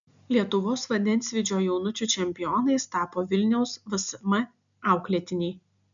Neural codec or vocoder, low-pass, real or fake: none; 7.2 kHz; real